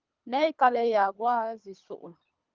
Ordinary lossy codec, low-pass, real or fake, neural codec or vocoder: Opus, 24 kbps; 7.2 kHz; fake; codec, 24 kHz, 3 kbps, HILCodec